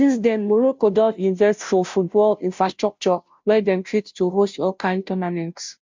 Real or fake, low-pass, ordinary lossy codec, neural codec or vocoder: fake; 7.2 kHz; MP3, 64 kbps; codec, 16 kHz, 0.5 kbps, FunCodec, trained on Chinese and English, 25 frames a second